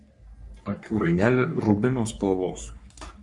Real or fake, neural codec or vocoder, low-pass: fake; codec, 44.1 kHz, 3.4 kbps, Pupu-Codec; 10.8 kHz